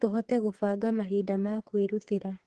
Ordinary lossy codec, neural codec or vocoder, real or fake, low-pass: Opus, 16 kbps; codec, 32 kHz, 1.9 kbps, SNAC; fake; 10.8 kHz